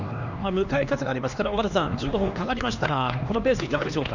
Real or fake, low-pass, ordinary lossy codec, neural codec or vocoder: fake; 7.2 kHz; none; codec, 16 kHz, 2 kbps, X-Codec, HuBERT features, trained on LibriSpeech